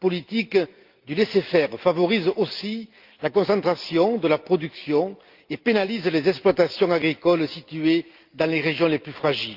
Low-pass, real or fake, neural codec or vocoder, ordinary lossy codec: 5.4 kHz; real; none; Opus, 24 kbps